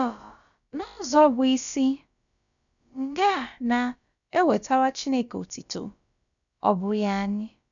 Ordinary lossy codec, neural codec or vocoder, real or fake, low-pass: none; codec, 16 kHz, about 1 kbps, DyCAST, with the encoder's durations; fake; 7.2 kHz